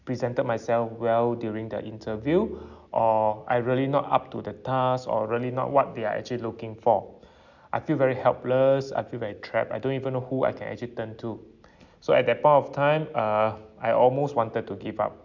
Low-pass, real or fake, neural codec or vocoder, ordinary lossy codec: 7.2 kHz; real; none; none